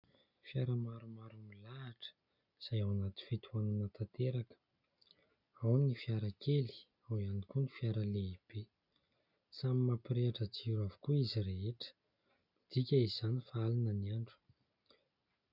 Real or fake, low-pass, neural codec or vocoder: real; 5.4 kHz; none